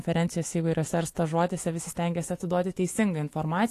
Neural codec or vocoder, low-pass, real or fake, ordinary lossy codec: autoencoder, 48 kHz, 128 numbers a frame, DAC-VAE, trained on Japanese speech; 14.4 kHz; fake; AAC, 48 kbps